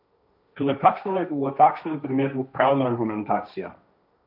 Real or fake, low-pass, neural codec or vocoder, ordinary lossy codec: fake; 5.4 kHz; codec, 16 kHz, 1.1 kbps, Voila-Tokenizer; none